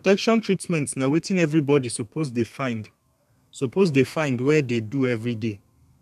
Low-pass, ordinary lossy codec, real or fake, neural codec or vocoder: 14.4 kHz; none; fake; codec, 32 kHz, 1.9 kbps, SNAC